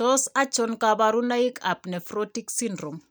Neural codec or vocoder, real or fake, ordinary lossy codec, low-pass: none; real; none; none